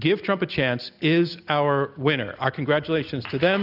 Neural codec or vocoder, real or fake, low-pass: none; real; 5.4 kHz